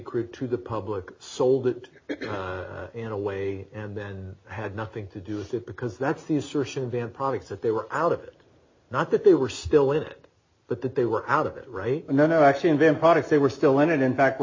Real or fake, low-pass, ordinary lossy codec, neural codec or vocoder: real; 7.2 kHz; MP3, 32 kbps; none